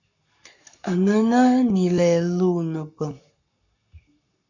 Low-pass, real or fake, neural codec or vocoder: 7.2 kHz; fake; codec, 44.1 kHz, 7.8 kbps, Pupu-Codec